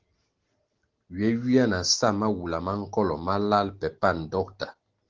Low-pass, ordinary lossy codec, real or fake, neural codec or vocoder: 7.2 kHz; Opus, 16 kbps; real; none